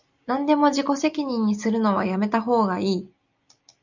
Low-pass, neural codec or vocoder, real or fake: 7.2 kHz; none; real